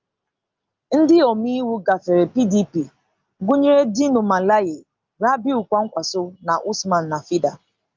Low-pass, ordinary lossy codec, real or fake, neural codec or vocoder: 7.2 kHz; Opus, 24 kbps; real; none